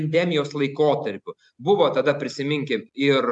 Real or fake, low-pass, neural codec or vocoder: real; 10.8 kHz; none